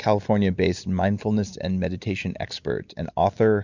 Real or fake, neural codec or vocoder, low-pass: real; none; 7.2 kHz